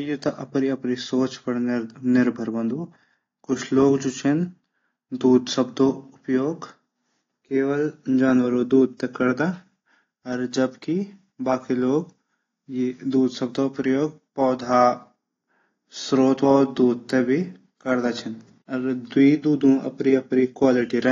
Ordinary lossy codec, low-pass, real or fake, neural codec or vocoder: AAC, 32 kbps; 7.2 kHz; real; none